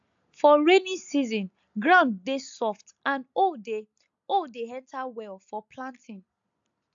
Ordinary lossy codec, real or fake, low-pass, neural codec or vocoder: AAC, 64 kbps; real; 7.2 kHz; none